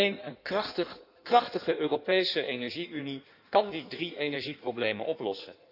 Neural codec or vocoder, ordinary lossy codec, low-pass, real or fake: codec, 16 kHz in and 24 kHz out, 1.1 kbps, FireRedTTS-2 codec; none; 5.4 kHz; fake